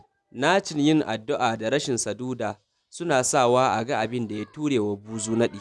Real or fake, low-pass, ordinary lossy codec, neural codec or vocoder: real; none; none; none